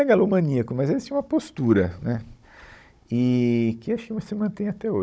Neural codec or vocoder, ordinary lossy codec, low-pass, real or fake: codec, 16 kHz, 16 kbps, FunCodec, trained on Chinese and English, 50 frames a second; none; none; fake